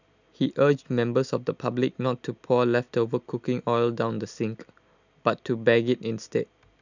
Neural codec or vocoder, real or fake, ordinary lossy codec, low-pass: none; real; none; 7.2 kHz